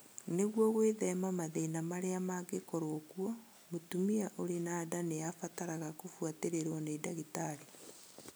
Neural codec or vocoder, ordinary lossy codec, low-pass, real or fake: none; none; none; real